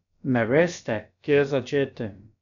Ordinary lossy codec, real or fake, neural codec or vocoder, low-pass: none; fake; codec, 16 kHz, about 1 kbps, DyCAST, with the encoder's durations; 7.2 kHz